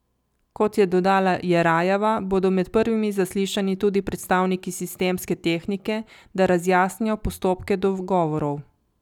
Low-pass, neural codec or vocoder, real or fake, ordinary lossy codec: 19.8 kHz; none; real; none